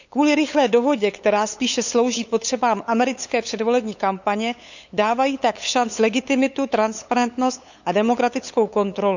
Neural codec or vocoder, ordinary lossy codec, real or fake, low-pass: codec, 16 kHz, 8 kbps, FunCodec, trained on LibriTTS, 25 frames a second; none; fake; 7.2 kHz